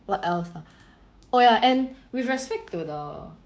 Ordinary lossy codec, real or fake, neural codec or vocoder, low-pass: none; fake; codec, 16 kHz, 6 kbps, DAC; none